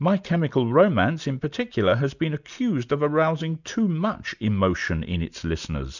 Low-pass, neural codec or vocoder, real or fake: 7.2 kHz; none; real